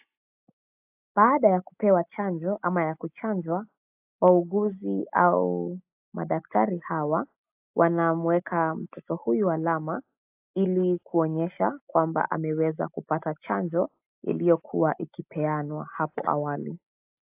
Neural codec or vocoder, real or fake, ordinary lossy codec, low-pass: none; real; AAC, 32 kbps; 3.6 kHz